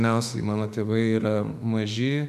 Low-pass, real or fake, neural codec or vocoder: 14.4 kHz; fake; autoencoder, 48 kHz, 32 numbers a frame, DAC-VAE, trained on Japanese speech